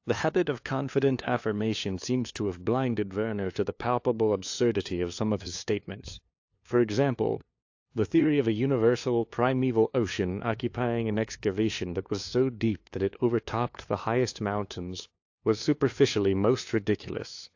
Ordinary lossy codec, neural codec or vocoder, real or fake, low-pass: AAC, 48 kbps; codec, 16 kHz, 2 kbps, FunCodec, trained on LibriTTS, 25 frames a second; fake; 7.2 kHz